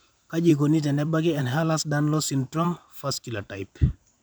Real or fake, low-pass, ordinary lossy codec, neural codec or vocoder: fake; none; none; vocoder, 44.1 kHz, 128 mel bands every 512 samples, BigVGAN v2